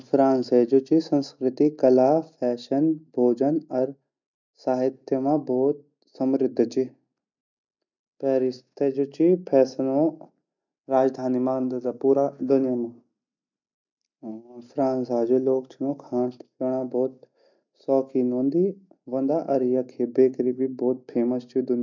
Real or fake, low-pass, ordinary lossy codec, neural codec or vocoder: real; 7.2 kHz; none; none